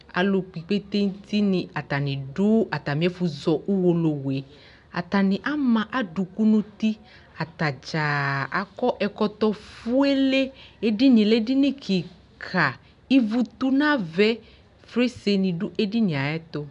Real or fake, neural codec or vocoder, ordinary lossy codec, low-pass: real; none; MP3, 96 kbps; 10.8 kHz